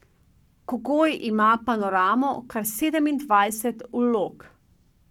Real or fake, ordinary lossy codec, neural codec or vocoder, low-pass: fake; none; codec, 44.1 kHz, 7.8 kbps, Pupu-Codec; 19.8 kHz